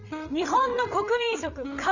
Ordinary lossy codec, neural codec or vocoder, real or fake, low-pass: none; codec, 16 kHz, 8 kbps, FreqCodec, larger model; fake; 7.2 kHz